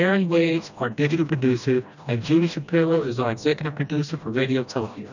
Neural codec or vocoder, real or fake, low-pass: codec, 16 kHz, 1 kbps, FreqCodec, smaller model; fake; 7.2 kHz